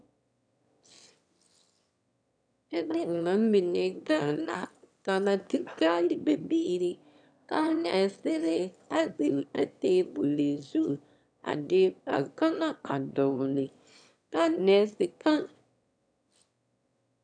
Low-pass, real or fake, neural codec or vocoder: 9.9 kHz; fake; autoencoder, 22.05 kHz, a latent of 192 numbers a frame, VITS, trained on one speaker